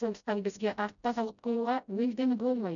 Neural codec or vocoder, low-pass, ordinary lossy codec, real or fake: codec, 16 kHz, 0.5 kbps, FreqCodec, smaller model; 7.2 kHz; none; fake